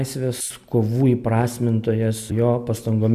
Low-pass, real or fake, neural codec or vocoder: 14.4 kHz; real; none